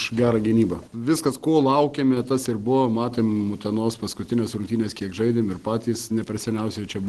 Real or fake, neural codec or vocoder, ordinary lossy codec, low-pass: real; none; Opus, 16 kbps; 10.8 kHz